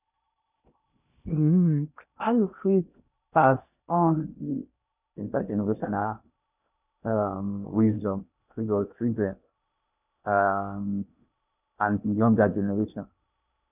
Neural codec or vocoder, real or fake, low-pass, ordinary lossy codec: codec, 16 kHz in and 24 kHz out, 0.6 kbps, FocalCodec, streaming, 2048 codes; fake; 3.6 kHz; none